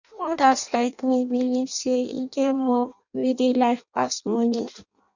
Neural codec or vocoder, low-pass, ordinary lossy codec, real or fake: codec, 16 kHz in and 24 kHz out, 0.6 kbps, FireRedTTS-2 codec; 7.2 kHz; none; fake